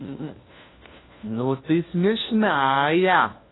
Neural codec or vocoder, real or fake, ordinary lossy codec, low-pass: codec, 16 kHz, 1 kbps, FunCodec, trained on LibriTTS, 50 frames a second; fake; AAC, 16 kbps; 7.2 kHz